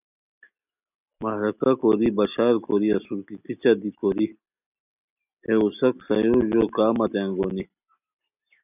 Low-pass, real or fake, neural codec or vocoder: 3.6 kHz; real; none